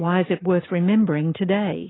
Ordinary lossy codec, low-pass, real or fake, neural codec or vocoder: AAC, 16 kbps; 7.2 kHz; real; none